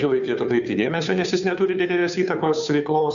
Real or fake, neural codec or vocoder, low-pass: fake; codec, 16 kHz, 2 kbps, FunCodec, trained on Chinese and English, 25 frames a second; 7.2 kHz